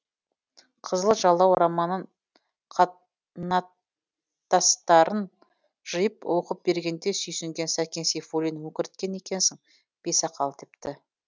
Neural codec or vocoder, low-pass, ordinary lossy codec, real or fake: none; none; none; real